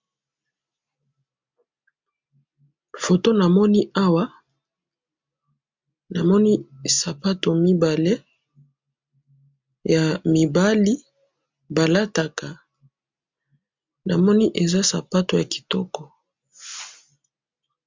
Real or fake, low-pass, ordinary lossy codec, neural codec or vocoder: real; 7.2 kHz; MP3, 64 kbps; none